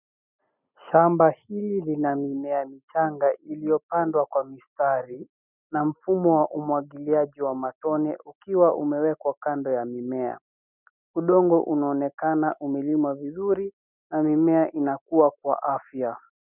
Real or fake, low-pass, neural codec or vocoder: real; 3.6 kHz; none